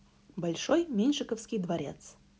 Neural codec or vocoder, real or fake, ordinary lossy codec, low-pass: none; real; none; none